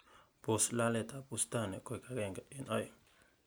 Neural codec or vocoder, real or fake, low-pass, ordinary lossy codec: none; real; none; none